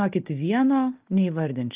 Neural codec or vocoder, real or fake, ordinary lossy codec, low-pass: none; real; Opus, 32 kbps; 3.6 kHz